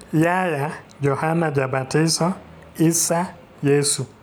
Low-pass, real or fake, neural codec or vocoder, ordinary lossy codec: none; real; none; none